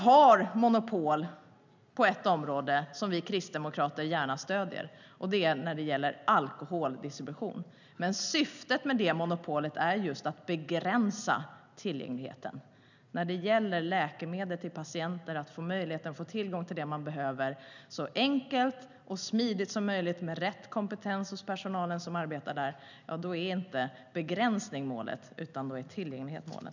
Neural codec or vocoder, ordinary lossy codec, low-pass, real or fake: none; none; 7.2 kHz; real